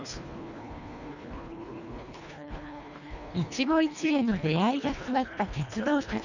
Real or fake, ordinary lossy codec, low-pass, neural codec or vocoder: fake; none; 7.2 kHz; codec, 24 kHz, 1.5 kbps, HILCodec